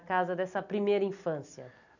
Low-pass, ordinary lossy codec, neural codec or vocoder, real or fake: 7.2 kHz; none; none; real